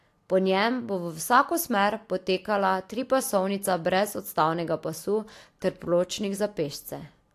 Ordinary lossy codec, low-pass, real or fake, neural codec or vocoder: AAC, 64 kbps; 14.4 kHz; real; none